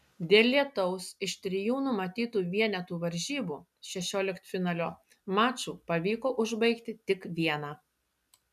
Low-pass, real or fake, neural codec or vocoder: 14.4 kHz; real; none